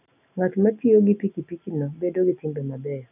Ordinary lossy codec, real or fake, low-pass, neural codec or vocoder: none; real; 3.6 kHz; none